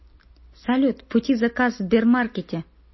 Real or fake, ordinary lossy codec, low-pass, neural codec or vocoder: real; MP3, 24 kbps; 7.2 kHz; none